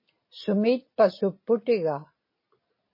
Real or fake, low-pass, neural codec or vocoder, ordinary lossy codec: real; 5.4 kHz; none; MP3, 24 kbps